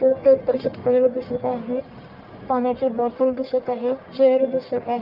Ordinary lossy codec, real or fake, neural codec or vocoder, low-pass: Opus, 24 kbps; fake; codec, 44.1 kHz, 1.7 kbps, Pupu-Codec; 5.4 kHz